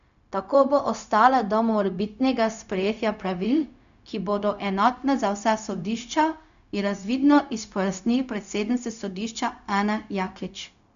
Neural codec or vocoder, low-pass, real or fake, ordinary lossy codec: codec, 16 kHz, 0.4 kbps, LongCat-Audio-Codec; 7.2 kHz; fake; none